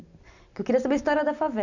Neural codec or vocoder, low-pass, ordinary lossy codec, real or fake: none; 7.2 kHz; none; real